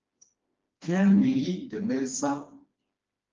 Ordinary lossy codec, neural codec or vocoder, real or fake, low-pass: Opus, 24 kbps; codec, 16 kHz, 2 kbps, FreqCodec, smaller model; fake; 7.2 kHz